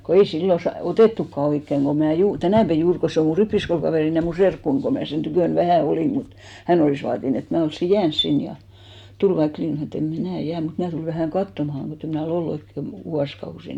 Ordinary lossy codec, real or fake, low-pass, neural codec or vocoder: none; real; 19.8 kHz; none